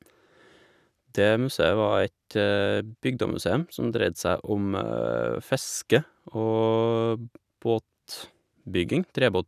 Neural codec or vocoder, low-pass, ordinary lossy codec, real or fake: none; 14.4 kHz; none; real